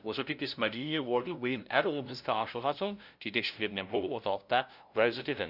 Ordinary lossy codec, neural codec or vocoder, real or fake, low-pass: Opus, 64 kbps; codec, 16 kHz, 0.5 kbps, FunCodec, trained on LibriTTS, 25 frames a second; fake; 5.4 kHz